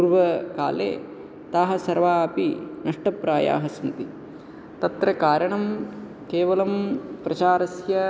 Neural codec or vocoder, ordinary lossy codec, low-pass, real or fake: none; none; none; real